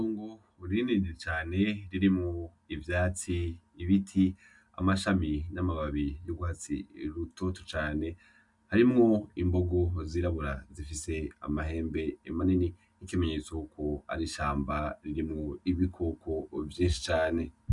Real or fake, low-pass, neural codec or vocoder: real; 10.8 kHz; none